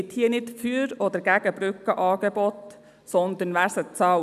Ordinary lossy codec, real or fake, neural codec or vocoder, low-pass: none; real; none; 14.4 kHz